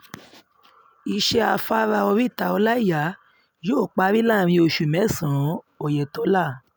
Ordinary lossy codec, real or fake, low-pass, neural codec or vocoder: none; real; none; none